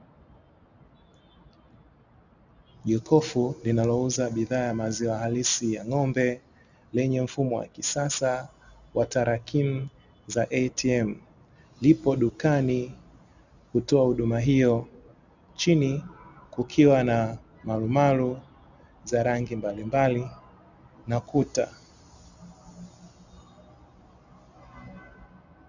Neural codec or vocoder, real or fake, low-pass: none; real; 7.2 kHz